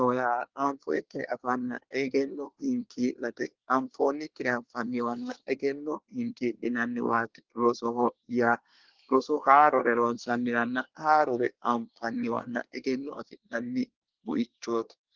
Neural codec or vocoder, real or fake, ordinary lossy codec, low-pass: codec, 24 kHz, 1 kbps, SNAC; fake; Opus, 16 kbps; 7.2 kHz